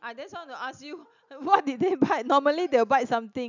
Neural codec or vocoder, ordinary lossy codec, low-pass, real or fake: none; none; 7.2 kHz; real